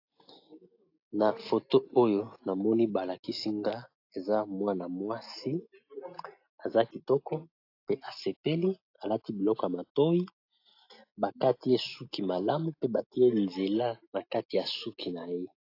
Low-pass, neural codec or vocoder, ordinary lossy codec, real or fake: 5.4 kHz; none; AAC, 32 kbps; real